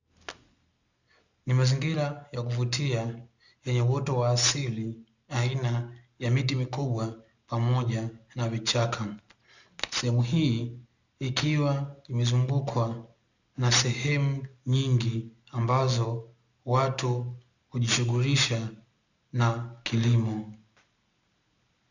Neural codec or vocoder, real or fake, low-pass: none; real; 7.2 kHz